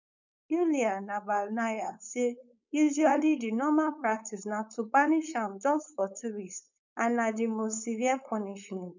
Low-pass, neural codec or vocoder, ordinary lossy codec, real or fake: 7.2 kHz; codec, 16 kHz, 4.8 kbps, FACodec; none; fake